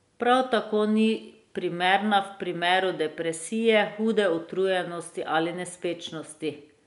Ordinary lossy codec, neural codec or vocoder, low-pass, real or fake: none; none; 10.8 kHz; real